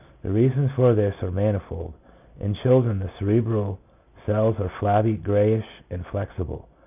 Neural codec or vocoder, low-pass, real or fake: none; 3.6 kHz; real